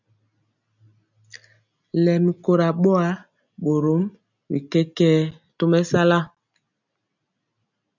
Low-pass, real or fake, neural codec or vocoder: 7.2 kHz; real; none